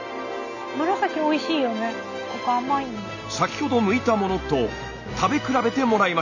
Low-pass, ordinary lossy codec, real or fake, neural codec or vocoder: 7.2 kHz; none; real; none